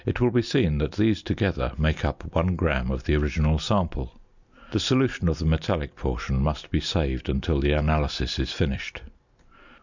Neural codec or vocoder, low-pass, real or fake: none; 7.2 kHz; real